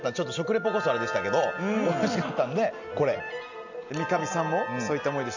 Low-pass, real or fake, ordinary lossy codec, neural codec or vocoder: 7.2 kHz; real; none; none